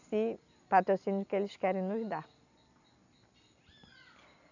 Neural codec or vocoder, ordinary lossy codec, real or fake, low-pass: none; none; real; 7.2 kHz